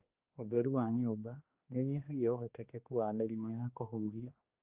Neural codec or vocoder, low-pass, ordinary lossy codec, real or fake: codec, 16 kHz, 2 kbps, X-Codec, HuBERT features, trained on general audio; 3.6 kHz; Opus, 32 kbps; fake